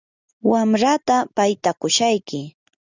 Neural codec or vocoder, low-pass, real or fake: none; 7.2 kHz; real